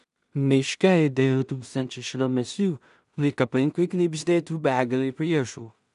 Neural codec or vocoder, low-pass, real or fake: codec, 16 kHz in and 24 kHz out, 0.4 kbps, LongCat-Audio-Codec, two codebook decoder; 10.8 kHz; fake